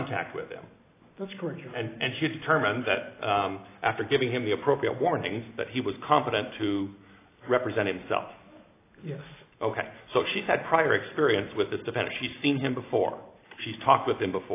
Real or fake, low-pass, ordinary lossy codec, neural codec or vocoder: real; 3.6 kHz; AAC, 24 kbps; none